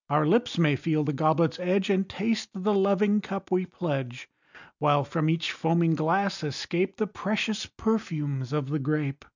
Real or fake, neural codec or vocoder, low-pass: real; none; 7.2 kHz